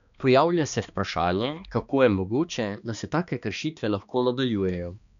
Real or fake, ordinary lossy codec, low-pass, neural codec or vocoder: fake; none; 7.2 kHz; codec, 16 kHz, 2 kbps, X-Codec, HuBERT features, trained on balanced general audio